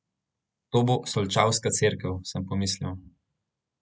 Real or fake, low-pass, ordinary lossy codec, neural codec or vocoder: real; none; none; none